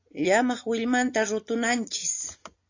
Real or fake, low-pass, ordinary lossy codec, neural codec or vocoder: real; 7.2 kHz; AAC, 48 kbps; none